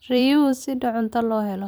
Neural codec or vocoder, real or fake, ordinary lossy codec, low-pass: vocoder, 44.1 kHz, 128 mel bands every 256 samples, BigVGAN v2; fake; none; none